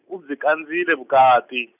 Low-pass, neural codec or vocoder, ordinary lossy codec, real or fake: 3.6 kHz; none; none; real